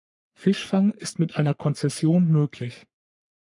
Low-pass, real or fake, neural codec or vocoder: 10.8 kHz; fake; codec, 44.1 kHz, 3.4 kbps, Pupu-Codec